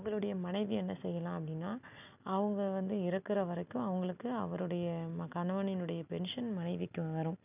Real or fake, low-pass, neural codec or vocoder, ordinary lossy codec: real; 3.6 kHz; none; none